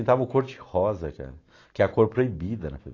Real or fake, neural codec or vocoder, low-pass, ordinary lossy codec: real; none; 7.2 kHz; AAC, 32 kbps